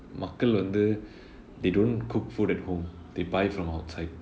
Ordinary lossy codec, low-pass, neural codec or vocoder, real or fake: none; none; none; real